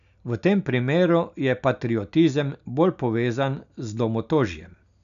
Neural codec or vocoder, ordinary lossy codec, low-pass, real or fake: none; none; 7.2 kHz; real